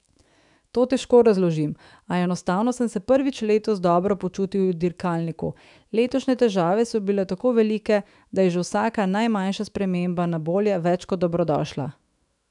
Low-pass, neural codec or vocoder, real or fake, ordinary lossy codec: 10.8 kHz; autoencoder, 48 kHz, 128 numbers a frame, DAC-VAE, trained on Japanese speech; fake; none